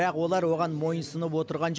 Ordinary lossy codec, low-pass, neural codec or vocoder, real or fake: none; none; none; real